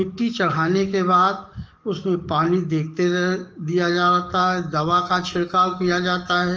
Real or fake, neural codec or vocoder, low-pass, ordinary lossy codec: fake; codec, 44.1 kHz, 7.8 kbps, DAC; 7.2 kHz; Opus, 24 kbps